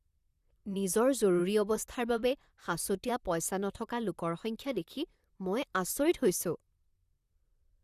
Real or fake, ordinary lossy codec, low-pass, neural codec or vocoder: fake; Opus, 64 kbps; 14.4 kHz; vocoder, 44.1 kHz, 128 mel bands, Pupu-Vocoder